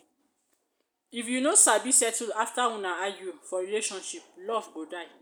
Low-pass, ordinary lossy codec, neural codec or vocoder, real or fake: none; none; none; real